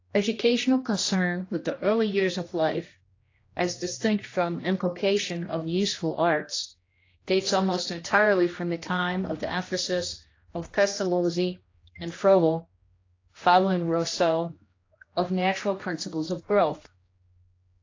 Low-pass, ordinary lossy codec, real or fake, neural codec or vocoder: 7.2 kHz; AAC, 32 kbps; fake; codec, 16 kHz, 1 kbps, X-Codec, HuBERT features, trained on general audio